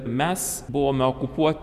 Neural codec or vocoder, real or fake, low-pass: none; real; 14.4 kHz